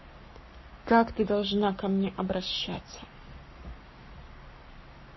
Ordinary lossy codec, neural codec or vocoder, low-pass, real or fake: MP3, 24 kbps; codec, 44.1 kHz, 7.8 kbps, Pupu-Codec; 7.2 kHz; fake